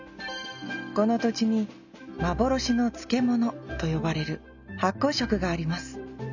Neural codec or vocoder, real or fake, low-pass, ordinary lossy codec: none; real; 7.2 kHz; none